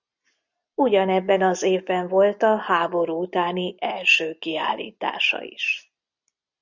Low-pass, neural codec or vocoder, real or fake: 7.2 kHz; none; real